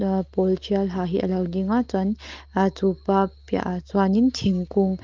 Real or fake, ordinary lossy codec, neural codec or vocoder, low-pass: real; Opus, 32 kbps; none; 7.2 kHz